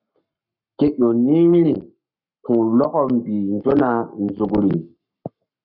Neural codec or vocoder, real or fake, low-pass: codec, 44.1 kHz, 7.8 kbps, Pupu-Codec; fake; 5.4 kHz